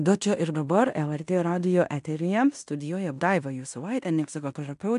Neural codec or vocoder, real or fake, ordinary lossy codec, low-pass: codec, 16 kHz in and 24 kHz out, 0.9 kbps, LongCat-Audio-Codec, fine tuned four codebook decoder; fake; AAC, 96 kbps; 10.8 kHz